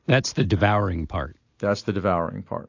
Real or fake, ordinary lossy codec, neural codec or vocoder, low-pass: real; AAC, 48 kbps; none; 7.2 kHz